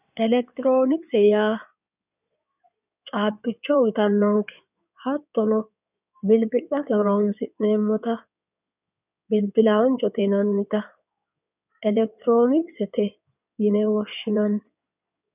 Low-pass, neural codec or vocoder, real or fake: 3.6 kHz; codec, 16 kHz in and 24 kHz out, 2.2 kbps, FireRedTTS-2 codec; fake